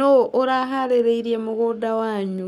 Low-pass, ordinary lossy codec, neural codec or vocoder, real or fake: 19.8 kHz; none; codec, 44.1 kHz, 7.8 kbps, Pupu-Codec; fake